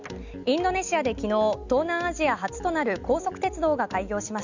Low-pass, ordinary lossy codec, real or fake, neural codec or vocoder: 7.2 kHz; none; real; none